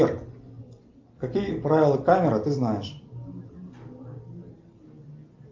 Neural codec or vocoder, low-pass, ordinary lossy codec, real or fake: none; 7.2 kHz; Opus, 24 kbps; real